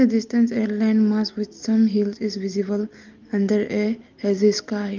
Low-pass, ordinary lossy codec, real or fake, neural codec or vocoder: 7.2 kHz; Opus, 32 kbps; real; none